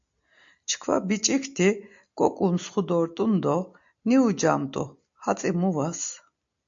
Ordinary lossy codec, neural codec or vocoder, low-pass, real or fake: AAC, 64 kbps; none; 7.2 kHz; real